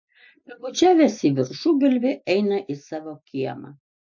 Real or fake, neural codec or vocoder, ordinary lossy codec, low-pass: real; none; MP3, 48 kbps; 7.2 kHz